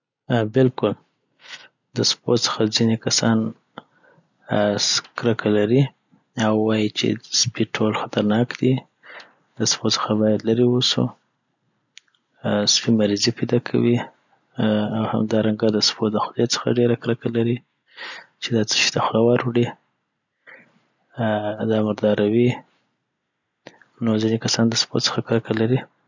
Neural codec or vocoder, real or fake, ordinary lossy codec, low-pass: none; real; none; 7.2 kHz